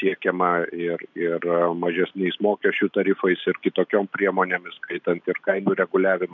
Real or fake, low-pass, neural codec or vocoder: real; 7.2 kHz; none